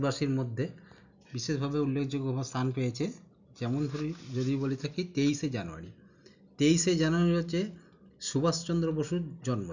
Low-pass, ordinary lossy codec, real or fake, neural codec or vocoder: 7.2 kHz; none; real; none